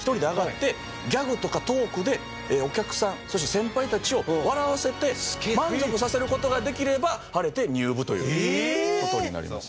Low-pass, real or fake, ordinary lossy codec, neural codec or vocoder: none; real; none; none